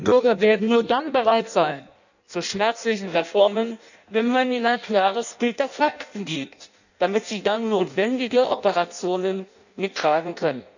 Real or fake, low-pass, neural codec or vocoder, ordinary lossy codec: fake; 7.2 kHz; codec, 16 kHz in and 24 kHz out, 0.6 kbps, FireRedTTS-2 codec; none